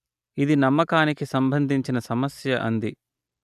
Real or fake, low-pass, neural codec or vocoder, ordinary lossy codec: real; 14.4 kHz; none; none